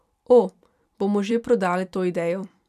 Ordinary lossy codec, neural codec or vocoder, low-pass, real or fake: none; vocoder, 44.1 kHz, 128 mel bands every 256 samples, BigVGAN v2; 14.4 kHz; fake